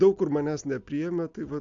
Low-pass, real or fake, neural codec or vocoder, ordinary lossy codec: 7.2 kHz; real; none; AAC, 64 kbps